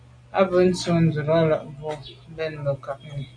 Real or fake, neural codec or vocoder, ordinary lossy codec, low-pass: real; none; AAC, 64 kbps; 9.9 kHz